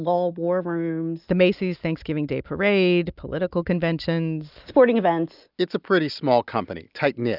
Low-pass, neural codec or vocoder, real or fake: 5.4 kHz; none; real